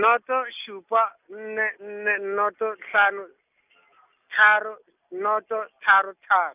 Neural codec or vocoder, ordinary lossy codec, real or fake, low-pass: none; none; real; 3.6 kHz